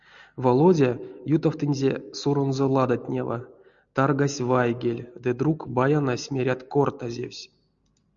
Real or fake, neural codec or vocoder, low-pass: real; none; 7.2 kHz